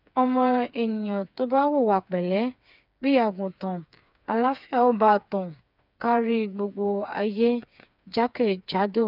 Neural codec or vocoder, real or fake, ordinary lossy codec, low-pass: codec, 16 kHz, 4 kbps, FreqCodec, smaller model; fake; none; 5.4 kHz